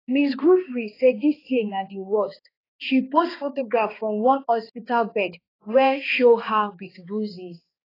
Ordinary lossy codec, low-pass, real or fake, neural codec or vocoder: AAC, 24 kbps; 5.4 kHz; fake; codec, 16 kHz, 2 kbps, X-Codec, HuBERT features, trained on balanced general audio